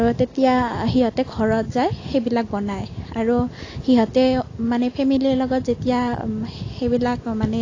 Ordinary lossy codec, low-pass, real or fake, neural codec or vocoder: AAC, 32 kbps; 7.2 kHz; real; none